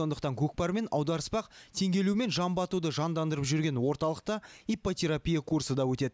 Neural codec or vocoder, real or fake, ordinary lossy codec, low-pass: none; real; none; none